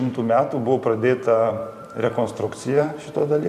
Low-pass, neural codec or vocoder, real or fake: 14.4 kHz; vocoder, 44.1 kHz, 128 mel bands every 512 samples, BigVGAN v2; fake